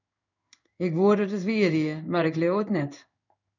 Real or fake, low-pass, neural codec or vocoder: fake; 7.2 kHz; codec, 16 kHz in and 24 kHz out, 1 kbps, XY-Tokenizer